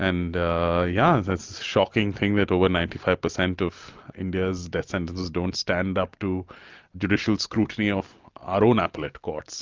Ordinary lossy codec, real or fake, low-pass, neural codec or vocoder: Opus, 24 kbps; real; 7.2 kHz; none